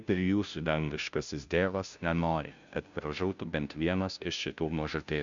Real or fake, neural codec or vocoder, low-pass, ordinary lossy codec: fake; codec, 16 kHz, 0.5 kbps, FunCodec, trained on Chinese and English, 25 frames a second; 7.2 kHz; Opus, 64 kbps